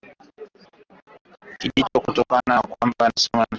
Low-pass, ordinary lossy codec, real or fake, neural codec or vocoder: 7.2 kHz; Opus, 16 kbps; real; none